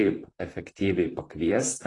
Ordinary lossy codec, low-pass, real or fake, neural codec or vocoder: AAC, 32 kbps; 10.8 kHz; fake; vocoder, 44.1 kHz, 128 mel bands, Pupu-Vocoder